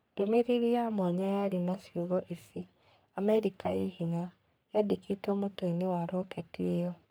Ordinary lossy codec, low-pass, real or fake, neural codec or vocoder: none; none; fake; codec, 44.1 kHz, 3.4 kbps, Pupu-Codec